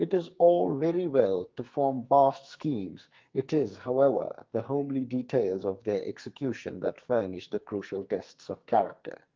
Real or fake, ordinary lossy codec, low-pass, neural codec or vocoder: fake; Opus, 32 kbps; 7.2 kHz; codec, 44.1 kHz, 2.6 kbps, SNAC